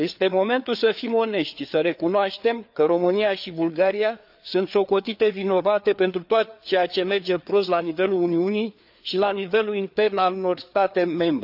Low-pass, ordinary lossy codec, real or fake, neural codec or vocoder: 5.4 kHz; none; fake; codec, 16 kHz, 4 kbps, FreqCodec, larger model